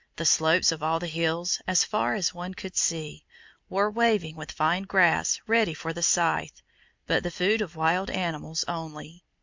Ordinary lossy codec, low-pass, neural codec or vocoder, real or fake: MP3, 64 kbps; 7.2 kHz; vocoder, 44.1 kHz, 128 mel bands every 256 samples, BigVGAN v2; fake